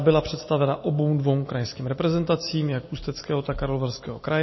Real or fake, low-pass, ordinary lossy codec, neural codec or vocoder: real; 7.2 kHz; MP3, 24 kbps; none